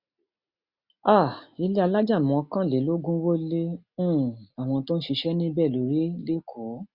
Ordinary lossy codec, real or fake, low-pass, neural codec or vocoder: Opus, 64 kbps; real; 5.4 kHz; none